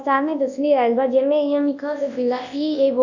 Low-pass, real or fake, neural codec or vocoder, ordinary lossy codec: 7.2 kHz; fake; codec, 24 kHz, 0.9 kbps, WavTokenizer, large speech release; none